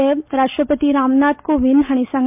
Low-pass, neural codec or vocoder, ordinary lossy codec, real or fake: 3.6 kHz; none; MP3, 32 kbps; real